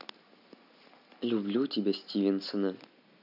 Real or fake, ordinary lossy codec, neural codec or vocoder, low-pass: real; none; none; 5.4 kHz